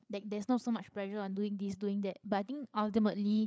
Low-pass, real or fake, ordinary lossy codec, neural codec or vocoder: none; fake; none; codec, 16 kHz, 8 kbps, FreqCodec, larger model